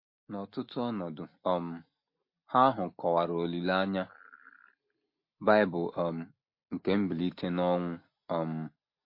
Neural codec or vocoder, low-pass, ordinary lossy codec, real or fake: none; 5.4 kHz; MP3, 32 kbps; real